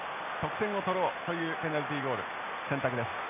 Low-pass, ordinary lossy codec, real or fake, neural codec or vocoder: 3.6 kHz; none; real; none